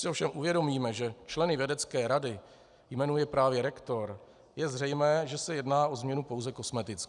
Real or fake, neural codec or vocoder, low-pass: real; none; 10.8 kHz